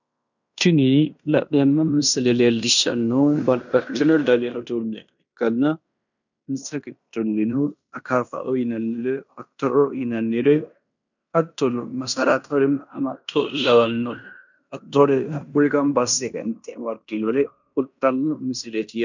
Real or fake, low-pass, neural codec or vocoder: fake; 7.2 kHz; codec, 16 kHz in and 24 kHz out, 0.9 kbps, LongCat-Audio-Codec, fine tuned four codebook decoder